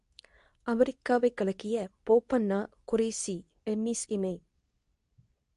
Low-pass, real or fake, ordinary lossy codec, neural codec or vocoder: 10.8 kHz; fake; MP3, 64 kbps; codec, 24 kHz, 0.9 kbps, WavTokenizer, medium speech release version 2